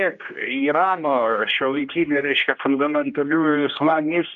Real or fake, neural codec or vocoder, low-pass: fake; codec, 16 kHz, 1 kbps, X-Codec, HuBERT features, trained on general audio; 7.2 kHz